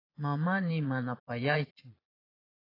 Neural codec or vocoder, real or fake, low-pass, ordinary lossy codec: vocoder, 22.05 kHz, 80 mel bands, Vocos; fake; 5.4 kHz; AAC, 24 kbps